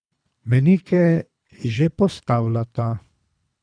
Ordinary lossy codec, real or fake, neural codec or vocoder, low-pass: none; fake; codec, 24 kHz, 3 kbps, HILCodec; 9.9 kHz